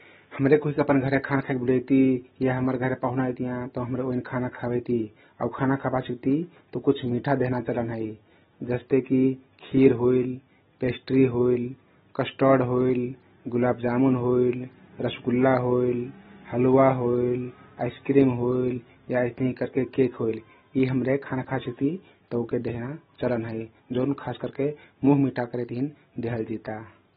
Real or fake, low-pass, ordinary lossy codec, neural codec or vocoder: real; 19.8 kHz; AAC, 16 kbps; none